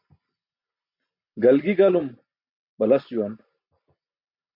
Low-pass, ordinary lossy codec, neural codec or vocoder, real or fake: 5.4 kHz; MP3, 48 kbps; none; real